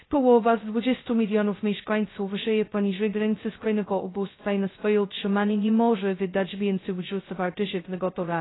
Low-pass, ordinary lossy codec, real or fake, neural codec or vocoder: 7.2 kHz; AAC, 16 kbps; fake; codec, 16 kHz, 0.2 kbps, FocalCodec